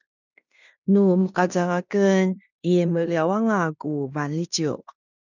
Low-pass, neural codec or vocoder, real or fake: 7.2 kHz; codec, 16 kHz in and 24 kHz out, 0.9 kbps, LongCat-Audio-Codec, fine tuned four codebook decoder; fake